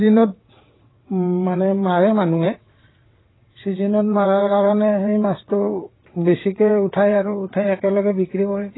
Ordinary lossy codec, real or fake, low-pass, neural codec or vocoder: AAC, 16 kbps; fake; 7.2 kHz; vocoder, 22.05 kHz, 80 mel bands, WaveNeXt